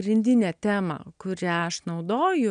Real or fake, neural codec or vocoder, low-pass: real; none; 9.9 kHz